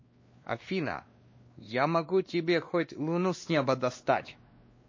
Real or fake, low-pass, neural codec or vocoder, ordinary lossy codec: fake; 7.2 kHz; codec, 16 kHz, 2 kbps, X-Codec, WavLM features, trained on Multilingual LibriSpeech; MP3, 32 kbps